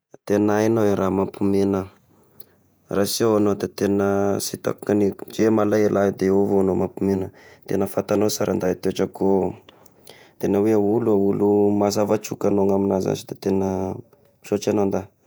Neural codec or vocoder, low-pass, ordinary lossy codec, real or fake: vocoder, 48 kHz, 128 mel bands, Vocos; none; none; fake